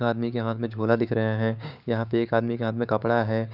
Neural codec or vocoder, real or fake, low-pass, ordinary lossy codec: codec, 16 kHz, 6 kbps, DAC; fake; 5.4 kHz; none